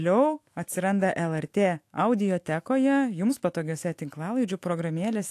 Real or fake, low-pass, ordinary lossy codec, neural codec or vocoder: fake; 14.4 kHz; AAC, 64 kbps; autoencoder, 48 kHz, 128 numbers a frame, DAC-VAE, trained on Japanese speech